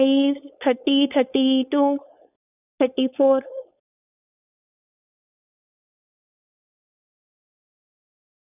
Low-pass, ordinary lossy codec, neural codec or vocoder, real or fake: 3.6 kHz; none; codec, 16 kHz, 4.8 kbps, FACodec; fake